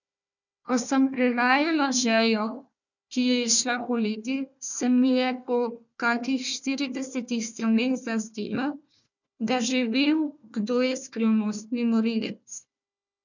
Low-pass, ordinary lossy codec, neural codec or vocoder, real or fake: 7.2 kHz; none; codec, 16 kHz, 1 kbps, FunCodec, trained on Chinese and English, 50 frames a second; fake